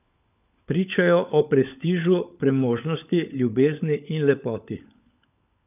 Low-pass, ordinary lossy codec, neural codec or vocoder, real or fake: 3.6 kHz; none; codec, 16 kHz, 16 kbps, FunCodec, trained on LibriTTS, 50 frames a second; fake